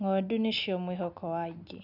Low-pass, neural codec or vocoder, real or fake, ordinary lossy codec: 5.4 kHz; none; real; none